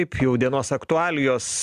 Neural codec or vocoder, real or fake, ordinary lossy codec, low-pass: none; real; AAC, 96 kbps; 14.4 kHz